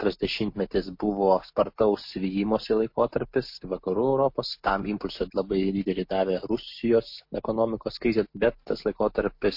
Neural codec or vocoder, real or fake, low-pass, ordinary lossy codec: none; real; 5.4 kHz; MP3, 32 kbps